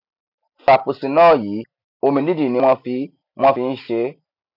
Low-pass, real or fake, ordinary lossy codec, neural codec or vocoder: 5.4 kHz; real; none; none